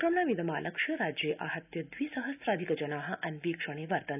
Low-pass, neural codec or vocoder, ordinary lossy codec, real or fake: 3.6 kHz; none; none; real